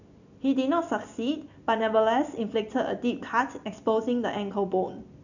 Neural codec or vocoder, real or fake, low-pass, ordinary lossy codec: none; real; 7.2 kHz; none